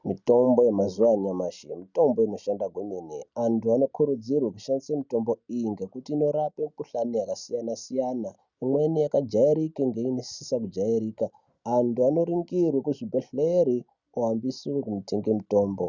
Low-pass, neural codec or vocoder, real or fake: 7.2 kHz; none; real